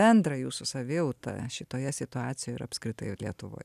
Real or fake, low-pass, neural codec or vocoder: real; 14.4 kHz; none